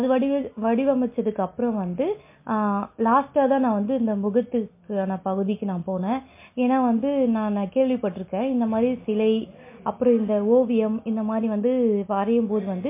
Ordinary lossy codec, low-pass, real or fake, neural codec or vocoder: MP3, 24 kbps; 3.6 kHz; real; none